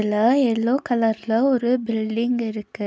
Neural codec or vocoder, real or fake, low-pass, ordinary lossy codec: none; real; none; none